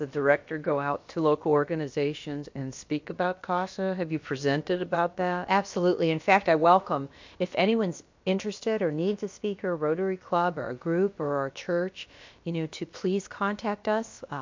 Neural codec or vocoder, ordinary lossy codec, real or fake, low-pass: codec, 16 kHz, about 1 kbps, DyCAST, with the encoder's durations; MP3, 48 kbps; fake; 7.2 kHz